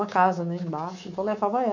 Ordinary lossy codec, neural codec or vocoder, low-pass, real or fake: none; codec, 24 kHz, 3.1 kbps, DualCodec; 7.2 kHz; fake